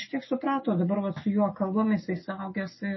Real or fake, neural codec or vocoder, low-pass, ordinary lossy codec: real; none; 7.2 kHz; MP3, 24 kbps